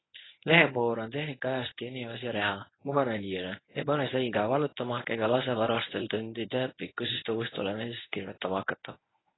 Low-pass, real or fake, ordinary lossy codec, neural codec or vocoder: 7.2 kHz; fake; AAC, 16 kbps; codec, 24 kHz, 0.9 kbps, WavTokenizer, medium speech release version 1